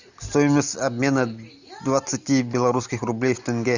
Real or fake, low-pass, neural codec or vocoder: real; 7.2 kHz; none